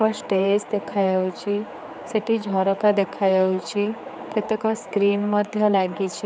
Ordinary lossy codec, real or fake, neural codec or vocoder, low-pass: none; fake; codec, 16 kHz, 4 kbps, X-Codec, HuBERT features, trained on general audio; none